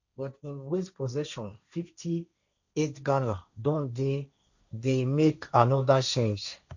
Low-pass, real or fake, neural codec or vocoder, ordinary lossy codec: 7.2 kHz; fake; codec, 16 kHz, 1.1 kbps, Voila-Tokenizer; none